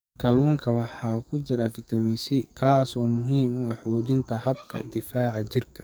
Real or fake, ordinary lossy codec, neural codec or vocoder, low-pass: fake; none; codec, 44.1 kHz, 2.6 kbps, SNAC; none